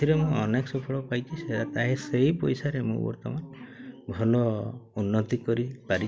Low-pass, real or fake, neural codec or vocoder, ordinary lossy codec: none; real; none; none